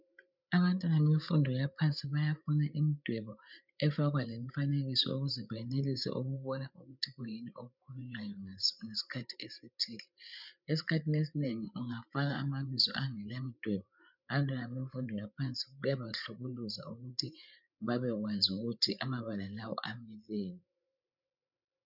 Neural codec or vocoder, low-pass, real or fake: codec, 16 kHz, 8 kbps, FreqCodec, larger model; 5.4 kHz; fake